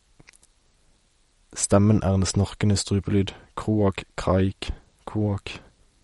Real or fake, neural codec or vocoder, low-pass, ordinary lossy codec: real; none; 19.8 kHz; MP3, 48 kbps